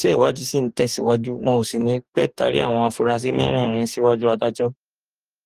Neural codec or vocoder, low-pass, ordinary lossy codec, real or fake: codec, 44.1 kHz, 2.6 kbps, DAC; 14.4 kHz; Opus, 24 kbps; fake